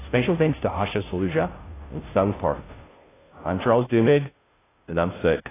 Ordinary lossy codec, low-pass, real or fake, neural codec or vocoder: AAC, 16 kbps; 3.6 kHz; fake; codec, 16 kHz, 0.5 kbps, FunCodec, trained on Chinese and English, 25 frames a second